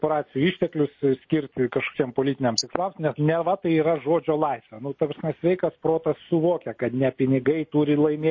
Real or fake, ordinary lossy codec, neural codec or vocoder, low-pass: real; MP3, 32 kbps; none; 7.2 kHz